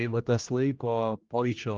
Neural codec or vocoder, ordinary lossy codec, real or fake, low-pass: codec, 16 kHz, 1 kbps, X-Codec, HuBERT features, trained on general audio; Opus, 24 kbps; fake; 7.2 kHz